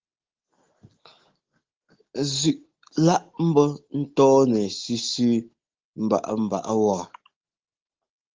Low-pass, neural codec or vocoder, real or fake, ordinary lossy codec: 7.2 kHz; none; real; Opus, 16 kbps